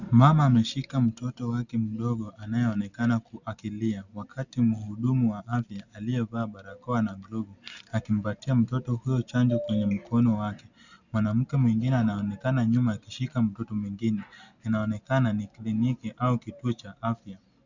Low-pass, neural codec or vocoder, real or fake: 7.2 kHz; none; real